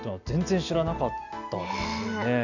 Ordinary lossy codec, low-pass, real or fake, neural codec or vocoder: none; 7.2 kHz; real; none